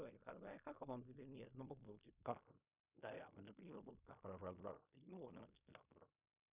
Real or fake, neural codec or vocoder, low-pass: fake; codec, 16 kHz in and 24 kHz out, 0.4 kbps, LongCat-Audio-Codec, fine tuned four codebook decoder; 3.6 kHz